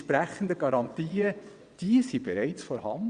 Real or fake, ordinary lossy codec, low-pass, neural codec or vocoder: fake; Opus, 64 kbps; 9.9 kHz; vocoder, 22.05 kHz, 80 mel bands, WaveNeXt